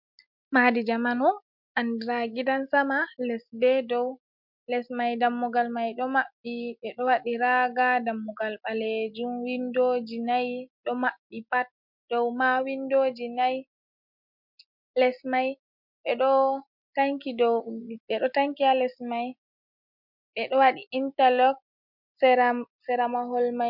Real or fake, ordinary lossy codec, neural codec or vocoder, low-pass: real; MP3, 48 kbps; none; 5.4 kHz